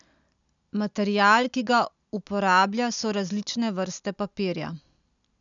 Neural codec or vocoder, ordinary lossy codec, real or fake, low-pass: none; none; real; 7.2 kHz